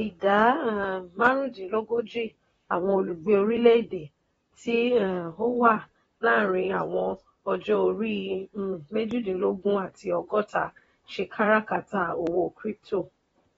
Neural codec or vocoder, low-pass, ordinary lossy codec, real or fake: vocoder, 44.1 kHz, 128 mel bands, Pupu-Vocoder; 19.8 kHz; AAC, 24 kbps; fake